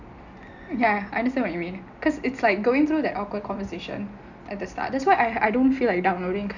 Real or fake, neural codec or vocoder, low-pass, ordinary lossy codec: real; none; 7.2 kHz; none